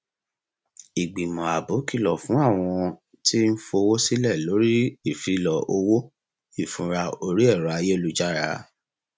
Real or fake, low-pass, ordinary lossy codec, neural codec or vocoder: real; none; none; none